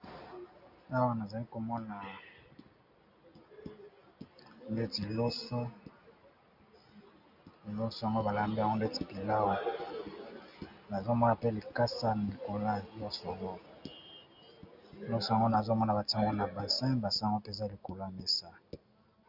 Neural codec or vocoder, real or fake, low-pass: none; real; 5.4 kHz